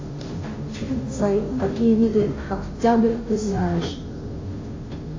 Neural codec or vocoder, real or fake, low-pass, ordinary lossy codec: codec, 16 kHz, 0.5 kbps, FunCodec, trained on Chinese and English, 25 frames a second; fake; 7.2 kHz; AAC, 32 kbps